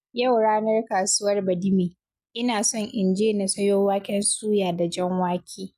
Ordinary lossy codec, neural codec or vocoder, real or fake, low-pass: none; none; real; 14.4 kHz